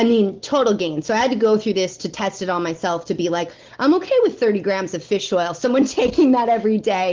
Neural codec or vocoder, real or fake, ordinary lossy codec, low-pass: none; real; Opus, 16 kbps; 7.2 kHz